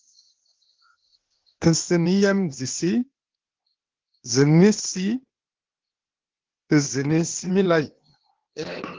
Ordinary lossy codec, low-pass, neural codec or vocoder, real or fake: Opus, 16 kbps; 7.2 kHz; codec, 16 kHz, 0.8 kbps, ZipCodec; fake